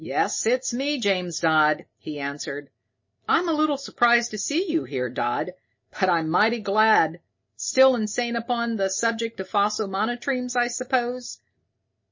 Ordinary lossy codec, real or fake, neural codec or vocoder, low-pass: MP3, 32 kbps; real; none; 7.2 kHz